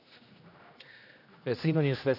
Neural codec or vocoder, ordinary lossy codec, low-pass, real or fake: codec, 16 kHz, 1 kbps, X-Codec, HuBERT features, trained on general audio; none; 5.4 kHz; fake